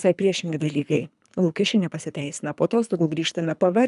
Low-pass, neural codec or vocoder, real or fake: 10.8 kHz; codec, 24 kHz, 3 kbps, HILCodec; fake